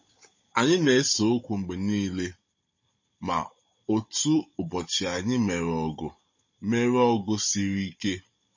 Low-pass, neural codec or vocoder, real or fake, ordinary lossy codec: 7.2 kHz; codec, 16 kHz, 16 kbps, FunCodec, trained on Chinese and English, 50 frames a second; fake; MP3, 32 kbps